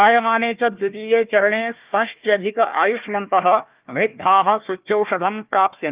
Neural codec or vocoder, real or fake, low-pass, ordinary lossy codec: codec, 16 kHz, 1 kbps, FunCodec, trained on Chinese and English, 50 frames a second; fake; 3.6 kHz; Opus, 32 kbps